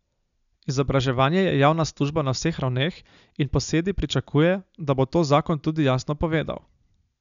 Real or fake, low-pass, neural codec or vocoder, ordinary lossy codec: real; 7.2 kHz; none; none